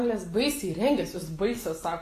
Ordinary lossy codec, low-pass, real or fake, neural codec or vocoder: AAC, 48 kbps; 14.4 kHz; real; none